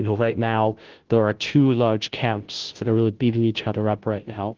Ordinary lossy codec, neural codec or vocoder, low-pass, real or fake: Opus, 16 kbps; codec, 16 kHz, 0.5 kbps, FunCodec, trained on Chinese and English, 25 frames a second; 7.2 kHz; fake